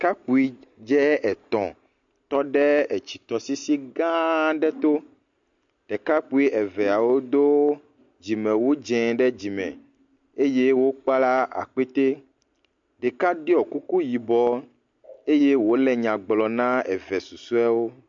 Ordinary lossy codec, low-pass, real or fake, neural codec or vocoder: MP3, 48 kbps; 7.2 kHz; real; none